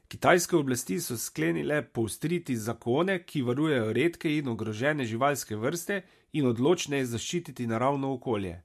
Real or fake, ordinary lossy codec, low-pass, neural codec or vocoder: real; MP3, 64 kbps; 14.4 kHz; none